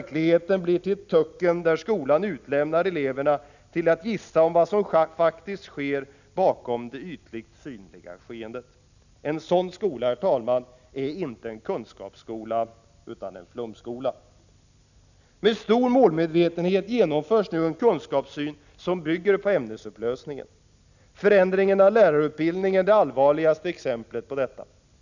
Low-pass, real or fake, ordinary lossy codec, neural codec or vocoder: 7.2 kHz; real; none; none